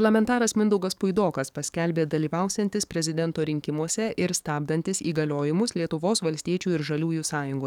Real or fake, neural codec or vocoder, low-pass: fake; codec, 44.1 kHz, 7.8 kbps, DAC; 19.8 kHz